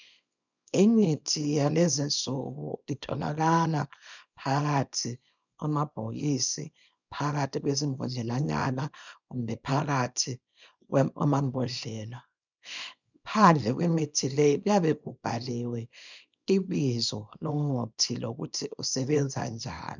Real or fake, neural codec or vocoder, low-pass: fake; codec, 24 kHz, 0.9 kbps, WavTokenizer, small release; 7.2 kHz